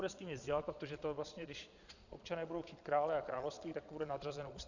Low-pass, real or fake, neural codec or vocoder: 7.2 kHz; fake; vocoder, 22.05 kHz, 80 mel bands, Vocos